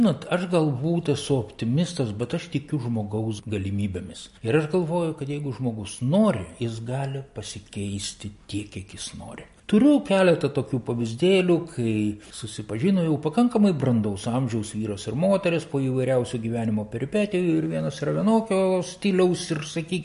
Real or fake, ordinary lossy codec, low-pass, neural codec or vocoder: real; MP3, 48 kbps; 10.8 kHz; none